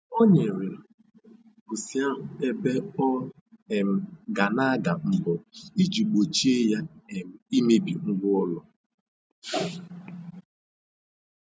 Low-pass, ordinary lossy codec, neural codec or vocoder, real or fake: none; none; none; real